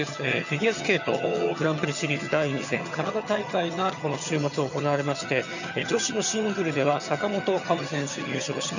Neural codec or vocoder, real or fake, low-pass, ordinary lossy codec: vocoder, 22.05 kHz, 80 mel bands, HiFi-GAN; fake; 7.2 kHz; none